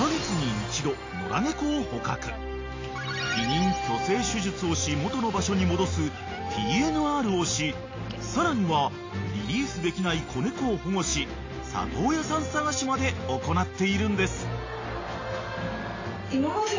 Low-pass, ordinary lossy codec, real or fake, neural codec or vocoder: 7.2 kHz; AAC, 32 kbps; real; none